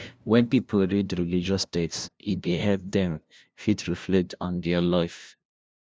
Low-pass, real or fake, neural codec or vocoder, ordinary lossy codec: none; fake; codec, 16 kHz, 0.5 kbps, FunCodec, trained on LibriTTS, 25 frames a second; none